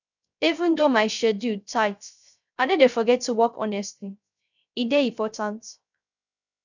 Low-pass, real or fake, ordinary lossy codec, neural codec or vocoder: 7.2 kHz; fake; none; codec, 16 kHz, 0.3 kbps, FocalCodec